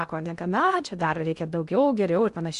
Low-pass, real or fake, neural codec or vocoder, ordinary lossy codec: 10.8 kHz; fake; codec, 16 kHz in and 24 kHz out, 0.6 kbps, FocalCodec, streaming, 2048 codes; Opus, 64 kbps